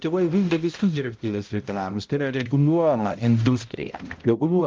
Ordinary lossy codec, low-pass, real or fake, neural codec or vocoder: Opus, 24 kbps; 7.2 kHz; fake; codec, 16 kHz, 0.5 kbps, X-Codec, HuBERT features, trained on balanced general audio